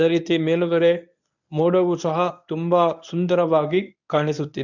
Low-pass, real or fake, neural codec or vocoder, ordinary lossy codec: 7.2 kHz; fake; codec, 24 kHz, 0.9 kbps, WavTokenizer, medium speech release version 1; none